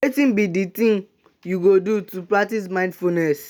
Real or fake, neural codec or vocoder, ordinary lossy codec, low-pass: real; none; none; none